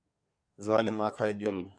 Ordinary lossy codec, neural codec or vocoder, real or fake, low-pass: MP3, 96 kbps; codec, 24 kHz, 1 kbps, SNAC; fake; 9.9 kHz